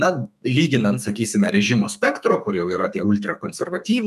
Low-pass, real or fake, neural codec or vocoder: 14.4 kHz; fake; codec, 32 kHz, 1.9 kbps, SNAC